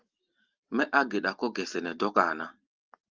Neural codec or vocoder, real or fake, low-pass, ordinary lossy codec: none; real; 7.2 kHz; Opus, 32 kbps